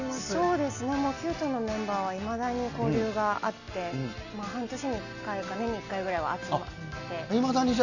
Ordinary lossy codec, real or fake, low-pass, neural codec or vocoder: none; real; 7.2 kHz; none